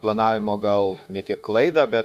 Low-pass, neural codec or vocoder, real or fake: 14.4 kHz; autoencoder, 48 kHz, 32 numbers a frame, DAC-VAE, trained on Japanese speech; fake